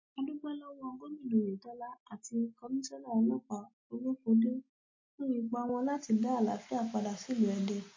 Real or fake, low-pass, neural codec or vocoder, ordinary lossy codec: real; 7.2 kHz; none; MP3, 32 kbps